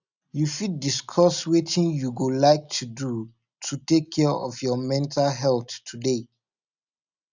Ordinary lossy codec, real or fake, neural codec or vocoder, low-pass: none; real; none; 7.2 kHz